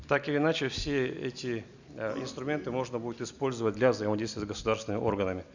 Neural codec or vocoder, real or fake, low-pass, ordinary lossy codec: none; real; 7.2 kHz; none